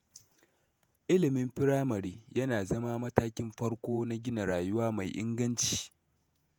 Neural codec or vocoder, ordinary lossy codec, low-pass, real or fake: vocoder, 48 kHz, 128 mel bands, Vocos; none; none; fake